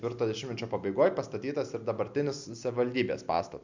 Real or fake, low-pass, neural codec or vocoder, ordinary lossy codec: real; 7.2 kHz; none; MP3, 64 kbps